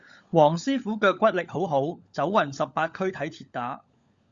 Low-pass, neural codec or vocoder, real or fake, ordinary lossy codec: 7.2 kHz; codec, 16 kHz, 16 kbps, FunCodec, trained on LibriTTS, 50 frames a second; fake; Opus, 64 kbps